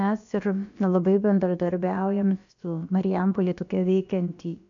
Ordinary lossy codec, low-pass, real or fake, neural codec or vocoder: AAC, 64 kbps; 7.2 kHz; fake; codec, 16 kHz, about 1 kbps, DyCAST, with the encoder's durations